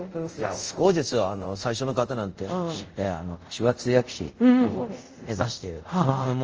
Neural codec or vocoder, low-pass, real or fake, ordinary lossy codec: codec, 24 kHz, 0.5 kbps, DualCodec; 7.2 kHz; fake; Opus, 24 kbps